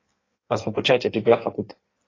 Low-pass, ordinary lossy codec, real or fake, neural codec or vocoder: 7.2 kHz; AAC, 32 kbps; fake; codec, 16 kHz in and 24 kHz out, 1.1 kbps, FireRedTTS-2 codec